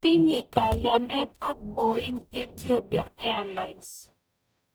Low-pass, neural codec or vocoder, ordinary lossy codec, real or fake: none; codec, 44.1 kHz, 0.9 kbps, DAC; none; fake